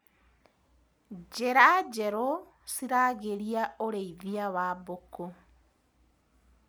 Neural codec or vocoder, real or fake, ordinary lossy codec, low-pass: none; real; none; none